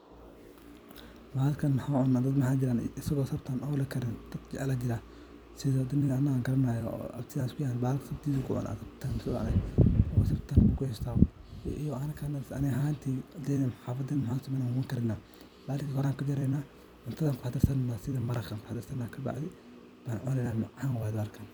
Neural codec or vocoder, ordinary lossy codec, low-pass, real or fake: vocoder, 44.1 kHz, 128 mel bands every 256 samples, BigVGAN v2; none; none; fake